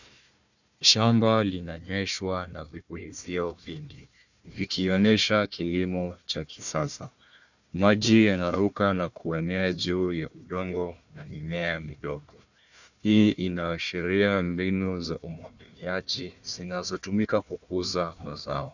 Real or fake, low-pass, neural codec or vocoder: fake; 7.2 kHz; codec, 16 kHz, 1 kbps, FunCodec, trained on Chinese and English, 50 frames a second